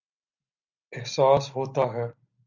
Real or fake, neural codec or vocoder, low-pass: real; none; 7.2 kHz